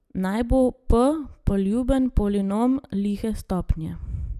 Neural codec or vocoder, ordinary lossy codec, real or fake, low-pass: none; none; real; 14.4 kHz